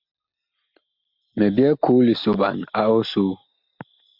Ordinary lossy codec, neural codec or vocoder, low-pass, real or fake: MP3, 48 kbps; none; 5.4 kHz; real